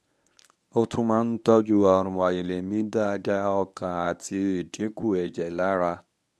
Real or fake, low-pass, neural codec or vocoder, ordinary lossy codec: fake; none; codec, 24 kHz, 0.9 kbps, WavTokenizer, medium speech release version 1; none